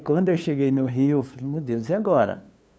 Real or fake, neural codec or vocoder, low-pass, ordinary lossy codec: fake; codec, 16 kHz, 2 kbps, FunCodec, trained on LibriTTS, 25 frames a second; none; none